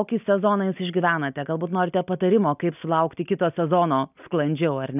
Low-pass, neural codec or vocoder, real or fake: 3.6 kHz; none; real